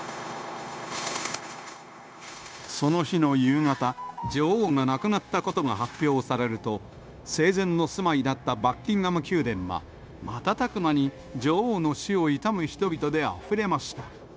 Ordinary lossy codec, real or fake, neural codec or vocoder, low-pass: none; fake; codec, 16 kHz, 0.9 kbps, LongCat-Audio-Codec; none